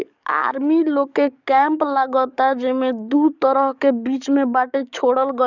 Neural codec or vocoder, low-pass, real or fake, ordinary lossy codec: codec, 44.1 kHz, 7.8 kbps, DAC; 7.2 kHz; fake; none